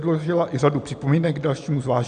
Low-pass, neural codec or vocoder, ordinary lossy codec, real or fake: 9.9 kHz; none; MP3, 96 kbps; real